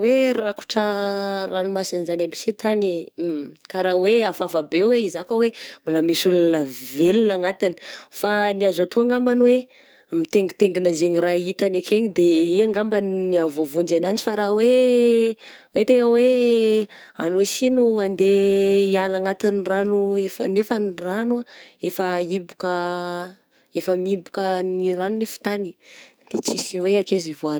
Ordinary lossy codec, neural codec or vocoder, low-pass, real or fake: none; codec, 44.1 kHz, 2.6 kbps, SNAC; none; fake